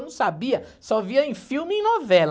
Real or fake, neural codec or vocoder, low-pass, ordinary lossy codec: real; none; none; none